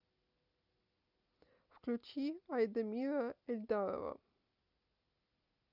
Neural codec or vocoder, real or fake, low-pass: none; real; 5.4 kHz